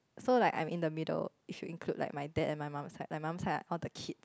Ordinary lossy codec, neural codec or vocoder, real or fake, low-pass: none; none; real; none